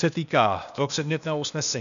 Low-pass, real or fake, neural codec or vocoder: 7.2 kHz; fake; codec, 16 kHz, 0.8 kbps, ZipCodec